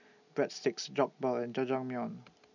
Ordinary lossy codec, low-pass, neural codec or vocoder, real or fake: none; 7.2 kHz; none; real